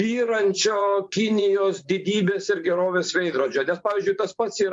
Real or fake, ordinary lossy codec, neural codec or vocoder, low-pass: fake; MP3, 64 kbps; vocoder, 24 kHz, 100 mel bands, Vocos; 10.8 kHz